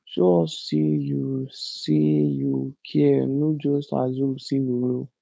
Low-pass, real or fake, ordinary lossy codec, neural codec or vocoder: none; fake; none; codec, 16 kHz, 4.8 kbps, FACodec